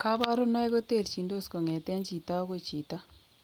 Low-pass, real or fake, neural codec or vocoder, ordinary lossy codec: 19.8 kHz; real; none; Opus, 32 kbps